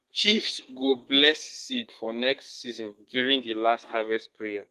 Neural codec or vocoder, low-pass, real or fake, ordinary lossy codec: codec, 32 kHz, 1.9 kbps, SNAC; 14.4 kHz; fake; Opus, 32 kbps